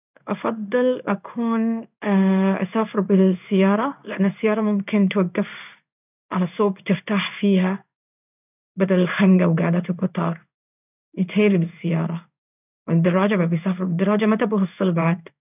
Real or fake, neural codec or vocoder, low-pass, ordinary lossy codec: fake; codec, 16 kHz in and 24 kHz out, 1 kbps, XY-Tokenizer; 3.6 kHz; none